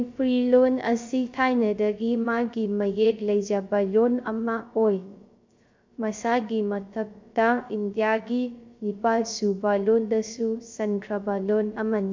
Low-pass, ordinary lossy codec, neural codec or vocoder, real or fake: 7.2 kHz; MP3, 64 kbps; codec, 16 kHz, 0.3 kbps, FocalCodec; fake